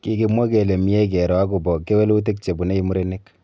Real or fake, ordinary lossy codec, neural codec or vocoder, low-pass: real; none; none; none